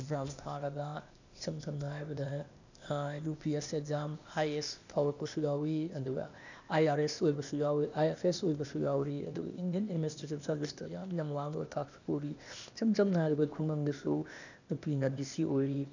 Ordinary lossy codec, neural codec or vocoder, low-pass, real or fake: none; codec, 16 kHz, 0.8 kbps, ZipCodec; 7.2 kHz; fake